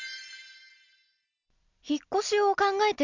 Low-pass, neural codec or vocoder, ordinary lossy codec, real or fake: 7.2 kHz; none; none; real